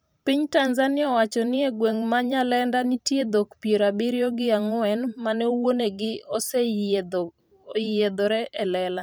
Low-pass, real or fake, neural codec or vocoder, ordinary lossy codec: none; fake; vocoder, 44.1 kHz, 128 mel bands every 512 samples, BigVGAN v2; none